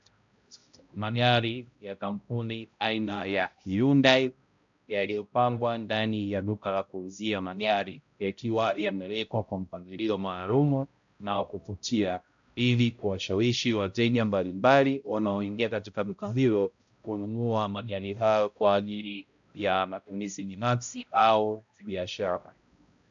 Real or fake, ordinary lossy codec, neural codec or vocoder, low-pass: fake; AAC, 64 kbps; codec, 16 kHz, 0.5 kbps, X-Codec, HuBERT features, trained on balanced general audio; 7.2 kHz